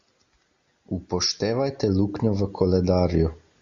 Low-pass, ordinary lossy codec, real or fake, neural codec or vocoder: 7.2 kHz; Opus, 64 kbps; real; none